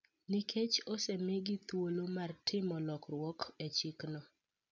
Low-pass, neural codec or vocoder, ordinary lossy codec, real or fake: 7.2 kHz; none; none; real